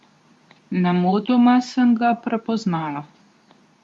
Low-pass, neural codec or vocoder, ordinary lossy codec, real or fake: none; codec, 24 kHz, 0.9 kbps, WavTokenizer, medium speech release version 2; none; fake